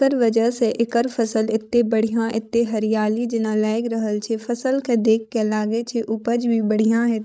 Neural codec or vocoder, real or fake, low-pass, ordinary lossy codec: codec, 16 kHz, 16 kbps, FreqCodec, larger model; fake; none; none